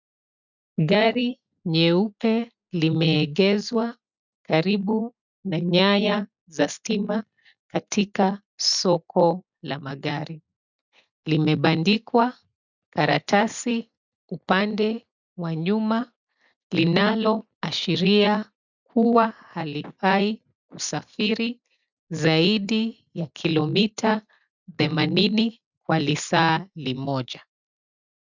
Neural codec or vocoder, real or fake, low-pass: none; real; 7.2 kHz